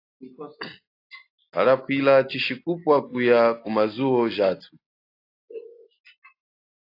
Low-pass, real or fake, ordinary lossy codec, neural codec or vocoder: 5.4 kHz; real; AAC, 32 kbps; none